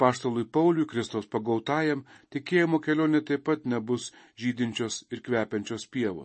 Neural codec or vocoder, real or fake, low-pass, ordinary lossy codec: none; real; 10.8 kHz; MP3, 32 kbps